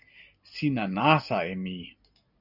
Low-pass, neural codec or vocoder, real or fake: 5.4 kHz; none; real